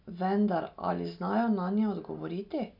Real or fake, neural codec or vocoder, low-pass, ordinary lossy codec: real; none; 5.4 kHz; none